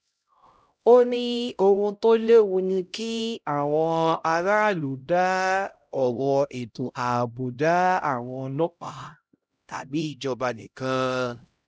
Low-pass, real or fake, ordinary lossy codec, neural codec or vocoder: none; fake; none; codec, 16 kHz, 0.5 kbps, X-Codec, HuBERT features, trained on LibriSpeech